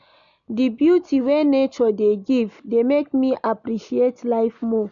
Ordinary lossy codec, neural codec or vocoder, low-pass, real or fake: none; none; 7.2 kHz; real